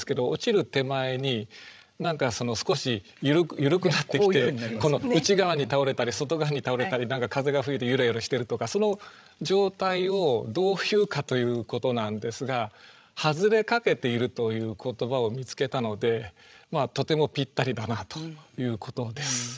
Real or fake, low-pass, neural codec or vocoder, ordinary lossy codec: fake; none; codec, 16 kHz, 16 kbps, FreqCodec, larger model; none